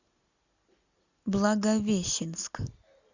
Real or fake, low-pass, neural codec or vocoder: real; 7.2 kHz; none